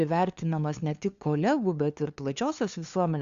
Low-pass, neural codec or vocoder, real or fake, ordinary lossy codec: 7.2 kHz; codec, 16 kHz, 2 kbps, FunCodec, trained on LibriTTS, 25 frames a second; fake; Opus, 64 kbps